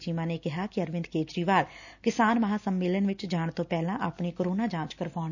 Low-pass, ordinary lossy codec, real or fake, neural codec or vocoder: 7.2 kHz; none; real; none